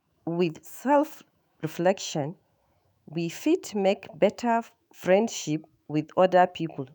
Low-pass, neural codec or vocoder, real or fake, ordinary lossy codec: none; autoencoder, 48 kHz, 128 numbers a frame, DAC-VAE, trained on Japanese speech; fake; none